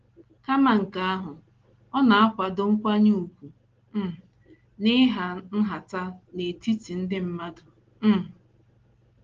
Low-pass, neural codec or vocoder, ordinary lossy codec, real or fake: 7.2 kHz; none; Opus, 16 kbps; real